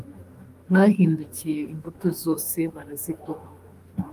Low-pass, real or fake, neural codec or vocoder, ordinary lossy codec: 14.4 kHz; fake; autoencoder, 48 kHz, 32 numbers a frame, DAC-VAE, trained on Japanese speech; Opus, 32 kbps